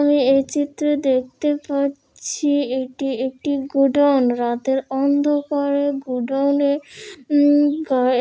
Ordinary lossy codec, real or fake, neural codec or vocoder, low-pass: none; real; none; none